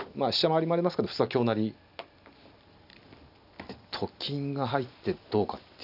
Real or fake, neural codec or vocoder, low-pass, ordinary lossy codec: real; none; 5.4 kHz; none